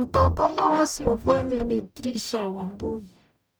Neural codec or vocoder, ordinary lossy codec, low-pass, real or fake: codec, 44.1 kHz, 0.9 kbps, DAC; none; none; fake